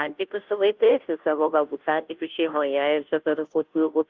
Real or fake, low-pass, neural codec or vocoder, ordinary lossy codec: fake; 7.2 kHz; codec, 16 kHz, 0.5 kbps, FunCodec, trained on Chinese and English, 25 frames a second; Opus, 24 kbps